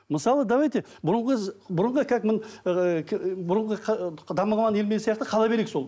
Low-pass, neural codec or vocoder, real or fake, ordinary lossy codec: none; none; real; none